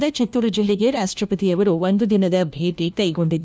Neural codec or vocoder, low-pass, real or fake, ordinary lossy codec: codec, 16 kHz, 1 kbps, FunCodec, trained on LibriTTS, 50 frames a second; none; fake; none